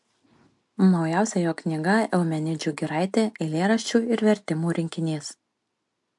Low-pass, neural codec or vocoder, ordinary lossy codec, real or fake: 10.8 kHz; none; MP3, 64 kbps; real